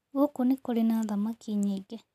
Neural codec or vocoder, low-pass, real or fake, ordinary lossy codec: none; 14.4 kHz; real; none